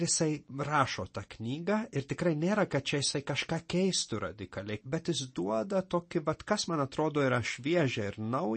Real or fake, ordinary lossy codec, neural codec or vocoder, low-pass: real; MP3, 32 kbps; none; 9.9 kHz